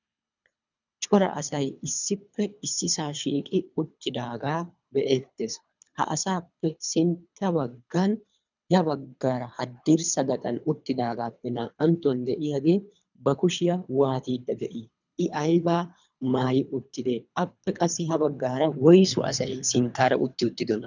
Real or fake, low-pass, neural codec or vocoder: fake; 7.2 kHz; codec, 24 kHz, 3 kbps, HILCodec